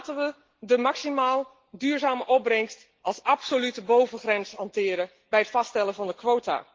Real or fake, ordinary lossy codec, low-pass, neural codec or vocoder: real; Opus, 16 kbps; 7.2 kHz; none